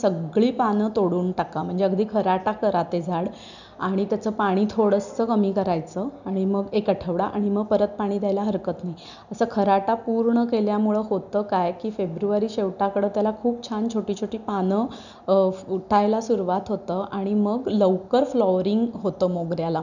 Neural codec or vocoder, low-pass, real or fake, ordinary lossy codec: none; 7.2 kHz; real; none